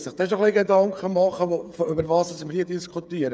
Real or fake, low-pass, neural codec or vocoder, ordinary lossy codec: fake; none; codec, 16 kHz, 8 kbps, FreqCodec, smaller model; none